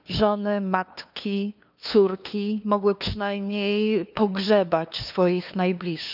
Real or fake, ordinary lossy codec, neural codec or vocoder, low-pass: fake; none; codec, 16 kHz, 2 kbps, FunCodec, trained on LibriTTS, 25 frames a second; 5.4 kHz